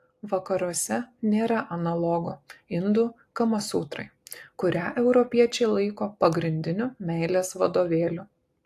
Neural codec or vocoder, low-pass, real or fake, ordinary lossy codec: none; 14.4 kHz; real; AAC, 64 kbps